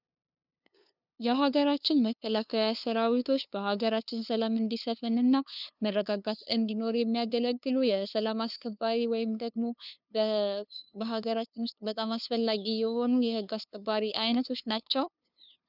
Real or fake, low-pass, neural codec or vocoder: fake; 5.4 kHz; codec, 16 kHz, 2 kbps, FunCodec, trained on LibriTTS, 25 frames a second